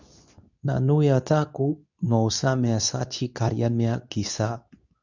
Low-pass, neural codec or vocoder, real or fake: 7.2 kHz; codec, 24 kHz, 0.9 kbps, WavTokenizer, medium speech release version 2; fake